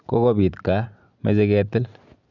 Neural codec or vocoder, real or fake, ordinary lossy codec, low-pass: none; real; none; 7.2 kHz